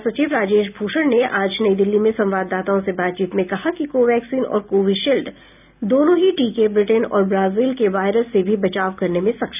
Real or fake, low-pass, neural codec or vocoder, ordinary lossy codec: real; 3.6 kHz; none; none